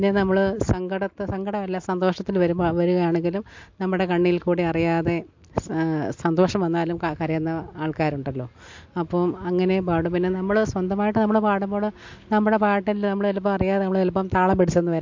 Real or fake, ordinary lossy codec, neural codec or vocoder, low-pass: real; MP3, 48 kbps; none; 7.2 kHz